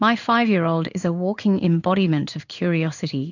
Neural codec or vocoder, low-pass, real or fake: codec, 16 kHz in and 24 kHz out, 1 kbps, XY-Tokenizer; 7.2 kHz; fake